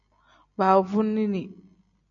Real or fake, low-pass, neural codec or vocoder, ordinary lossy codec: real; 7.2 kHz; none; MP3, 96 kbps